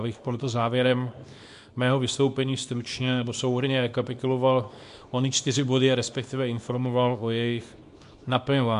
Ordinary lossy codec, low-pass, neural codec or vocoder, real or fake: MP3, 64 kbps; 10.8 kHz; codec, 24 kHz, 0.9 kbps, WavTokenizer, small release; fake